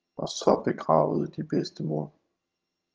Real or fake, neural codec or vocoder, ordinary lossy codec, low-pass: fake; vocoder, 22.05 kHz, 80 mel bands, HiFi-GAN; Opus, 24 kbps; 7.2 kHz